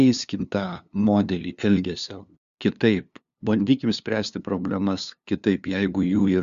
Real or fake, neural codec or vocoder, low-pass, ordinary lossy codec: fake; codec, 16 kHz, 2 kbps, FunCodec, trained on LibriTTS, 25 frames a second; 7.2 kHz; Opus, 64 kbps